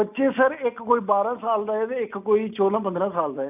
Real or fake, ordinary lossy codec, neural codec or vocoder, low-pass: real; none; none; 3.6 kHz